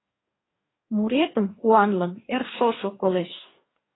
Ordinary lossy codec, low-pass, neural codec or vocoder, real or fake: AAC, 16 kbps; 7.2 kHz; codec, 44.1 kHz, 2.6 kbps, DAC; fake